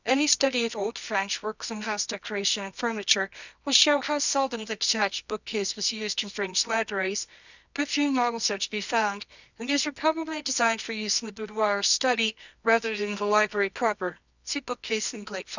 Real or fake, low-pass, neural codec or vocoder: fake; 7.2 kHz; codec, 24 kHz, 0.9 kbps, WavTokenizer, medium music audio release